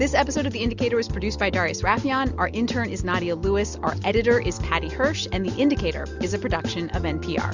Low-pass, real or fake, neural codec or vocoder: 7.2 kHz; real; none